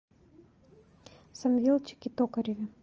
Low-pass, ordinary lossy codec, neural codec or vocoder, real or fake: 7.2 kHz; Opus, 24 kbps; none; real